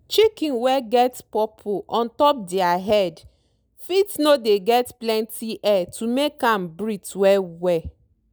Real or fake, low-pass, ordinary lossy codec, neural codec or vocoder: real; none; none; none